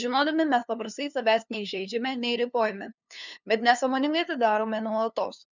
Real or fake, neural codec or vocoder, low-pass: fake; codec, 16 kHz, 2 kbps, FunCodec, trained on LibriTTS, 25 frames a second; 7.2 kHz